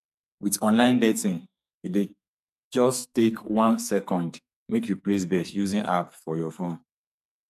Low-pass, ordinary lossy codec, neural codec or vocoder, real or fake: 14.4 kHz; none; codec, 44.1 kHz, 2.6 kbps, SNAC; fake